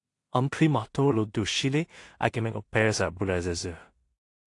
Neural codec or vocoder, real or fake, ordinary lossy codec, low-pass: codec, 16 kHz in and 24 kHz out, 0.4 kbps, LongCat-Audio-Codec, two codebook decoder; fake; AAC, 48 kbps; 10.8 kHz